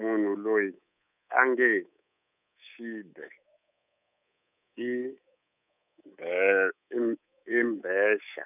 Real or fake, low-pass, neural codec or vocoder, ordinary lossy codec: fake; 3.6 kHz; codec, 24 kHz, 3.1 kbps, DualCodec; none